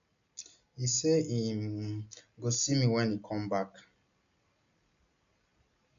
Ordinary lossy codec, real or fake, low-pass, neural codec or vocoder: none; real; 7.2 kHz; none